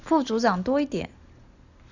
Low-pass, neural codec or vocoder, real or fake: 7.2 kHz; none; real